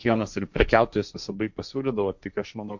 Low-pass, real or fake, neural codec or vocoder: 7.2 kHz; fake; codec, 16 kHz, 1.1 kbps, Voila-Tokenizer